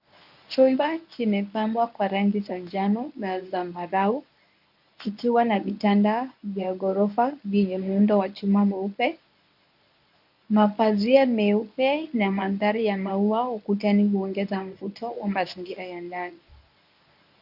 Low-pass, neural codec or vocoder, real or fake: 5.4 kHz; codec, 24 kHz, 0.9 kbps, WavTokenizer, medium speech release version 1; fake